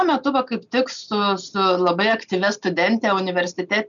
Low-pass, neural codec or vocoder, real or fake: 7.2 kHz; none; real